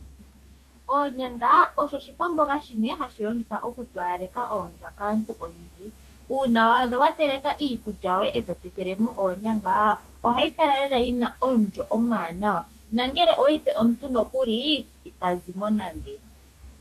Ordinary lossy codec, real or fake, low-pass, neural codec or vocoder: MP3, 96 kbps; fake; 14.4 kHz; codec, 44.1 kHz, 2.6 kbps, DAC